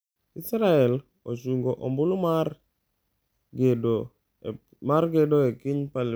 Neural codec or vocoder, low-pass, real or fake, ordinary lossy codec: none; none; real; none